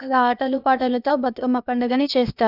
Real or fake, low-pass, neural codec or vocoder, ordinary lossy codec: fake; 5.4 kHz; codec, 16 kHz, 0.8 kbps, ZipCodec; none